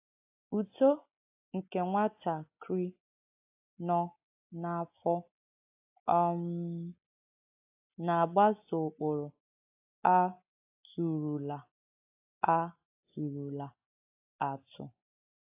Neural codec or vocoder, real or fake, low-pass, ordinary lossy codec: none; real; 3.6 kHz; AAC, 32 kbps